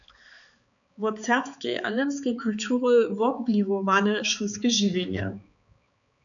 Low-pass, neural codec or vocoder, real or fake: 7.2 kHz; codec, 16 kHz, 4 kbps, X-Codec, HuBERT features, trained on balanced general audio; fake